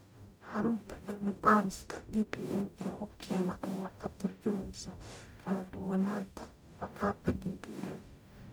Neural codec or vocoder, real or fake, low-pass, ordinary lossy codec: codec, 44.1 kHz, 0.9 kbps, DAC; fake; none; none